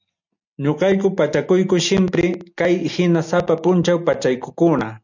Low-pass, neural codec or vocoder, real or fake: 7.2 kHz; none; real